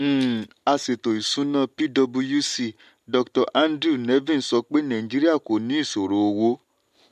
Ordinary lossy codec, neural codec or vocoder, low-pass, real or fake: MP3, 64 kbps; none; 14.4 kHz; real